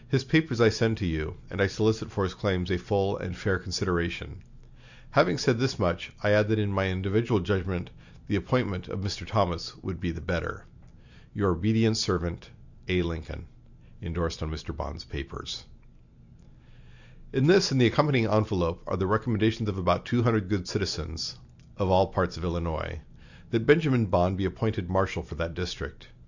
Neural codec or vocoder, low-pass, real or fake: none; 7.2 kHz; real